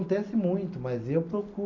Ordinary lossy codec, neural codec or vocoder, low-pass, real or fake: none; none; 7.2 kHz; real